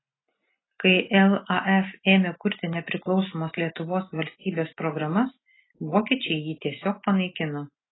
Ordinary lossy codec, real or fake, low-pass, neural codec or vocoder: AAC, 16 kbps; real; 7.2 kHz; none